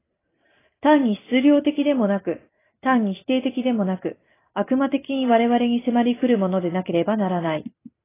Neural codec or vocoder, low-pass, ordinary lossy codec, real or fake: none; 3.6 kHz; AAC, 16 kbps; real